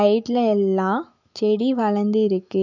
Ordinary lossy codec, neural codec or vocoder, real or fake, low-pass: none; autoencoder, 48 kHz, 128 numbers a frame, DAC-VAE, trained on Japanese speech; fake; 7.2 kHz